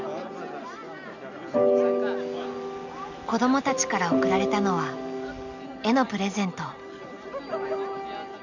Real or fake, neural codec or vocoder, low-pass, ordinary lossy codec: real; none; 7.2 kHz; none